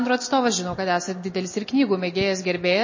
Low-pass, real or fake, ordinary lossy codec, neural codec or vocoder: 7.2 kHz; real; MP3, 32 kbps; none